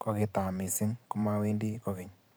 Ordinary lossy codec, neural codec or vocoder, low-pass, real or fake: none; vocoder, 44.1 kHz, 128 mel bands every 512 samples, BigVGAN v2; none; fake